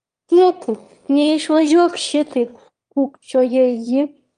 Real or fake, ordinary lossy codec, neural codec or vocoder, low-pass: fake; Opus, 32 kbps; autoencoder, 22.05 kHz, a latent of 192 numbers a frame, VITS, trained on one speaker; 9.9 kHz